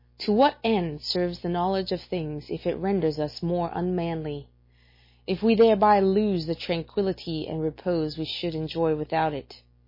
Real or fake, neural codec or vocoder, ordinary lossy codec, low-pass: real; none; MP3, 24 kbps; 5.4 kHz